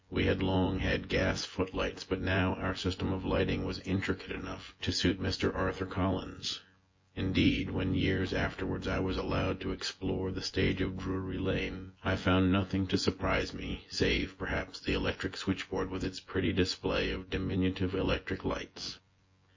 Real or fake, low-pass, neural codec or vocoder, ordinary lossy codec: fake; 7.2 kHz; vocoder, 24 kHz, 100 mel bands, Vocos; MP3, 32 kbps